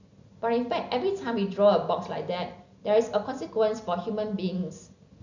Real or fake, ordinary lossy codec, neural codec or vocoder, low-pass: real; none; none; 7.2 kHz